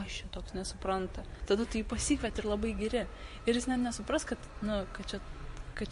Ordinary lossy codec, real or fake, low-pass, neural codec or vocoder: MP3, 48 kbps; fake; 10.8 kHz; vocoder, 24 kHz, 100 mel bands, Vocos